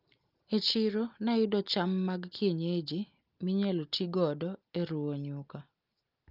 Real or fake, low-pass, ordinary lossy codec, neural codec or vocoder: real; 5.4 kHz; Opus, 32 kbps; none